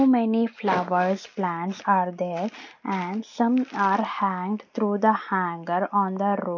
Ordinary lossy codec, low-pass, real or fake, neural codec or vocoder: none; 7.2 kHz; real; none